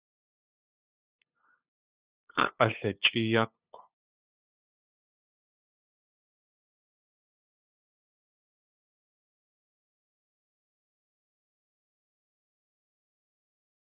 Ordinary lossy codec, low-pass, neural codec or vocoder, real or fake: Opus, 64 kbps; 3.6 kHz; codec, 16 kHz, 8 kbps, FunCodec, trained on LibriTTS, 25 frames a second; fake